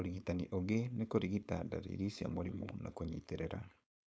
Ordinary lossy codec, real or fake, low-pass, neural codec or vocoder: none; fake; none; codec, 16 kHz, 4.8 kbps, FACodec